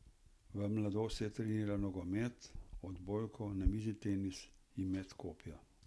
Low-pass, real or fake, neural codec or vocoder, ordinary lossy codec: none; real; none; none